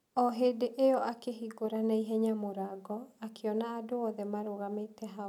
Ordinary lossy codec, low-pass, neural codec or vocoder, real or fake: none; 19.8 kHz; none; real